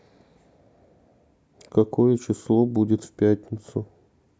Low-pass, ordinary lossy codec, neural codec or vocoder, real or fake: none; none; none; real